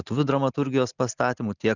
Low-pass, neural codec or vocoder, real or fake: 7.2 kHz; none; real